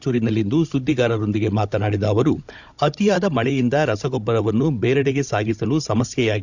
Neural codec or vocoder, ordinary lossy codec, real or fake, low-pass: codec, 16 kHz, 16 kbps, FunCodec, trained on Chinese and English, 50 frames a second; none; fake; 7.2 kHz